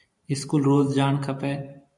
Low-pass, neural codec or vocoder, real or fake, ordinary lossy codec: 10.8 kHz; vocoder, 44.1 kHz, 128 mel bands every 512 samples, BigVGAN v2; fake; MP3, 96 kbps